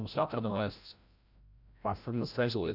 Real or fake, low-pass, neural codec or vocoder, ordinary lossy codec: fake; 5.4 kHz; codec, 16 kHz, 0.5 kbps, FreqCodec, larger model; none